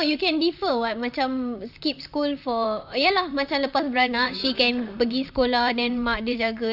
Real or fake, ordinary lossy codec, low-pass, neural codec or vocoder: fake; none; 5.4 kHz; vocoder, 44.1 kHz, 128 mel bands, Pupu-Vocoder